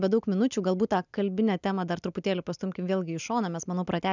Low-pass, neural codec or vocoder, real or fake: 7.2 kHz; none; real